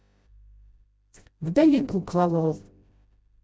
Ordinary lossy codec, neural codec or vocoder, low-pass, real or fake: none; codec, 16 kHz, 0.5 kbps, FreqCodec, smaller model; none; fake